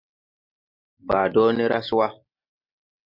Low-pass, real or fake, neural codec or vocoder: 5.4 kHz; real; none